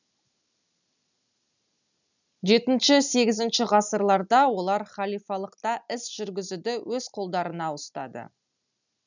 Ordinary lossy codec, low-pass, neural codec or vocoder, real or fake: none; 7.2 kHz; none; real